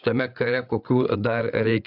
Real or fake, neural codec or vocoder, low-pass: fake; codec, 16 kHz, 8 kbps, FreqCodec, larger model; 5.4 kHz